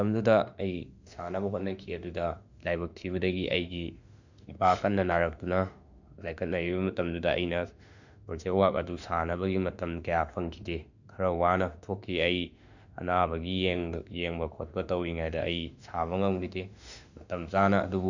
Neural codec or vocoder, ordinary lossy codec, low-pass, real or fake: autoencoder, 48 kHz, 32 numbers a frame, DAC-VAE, trained on Japanese speech; none; 7.2 kHz; fake